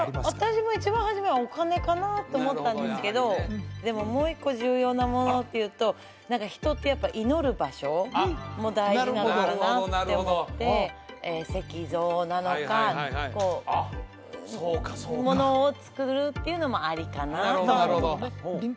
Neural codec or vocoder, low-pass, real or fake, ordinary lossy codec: none; none; real; none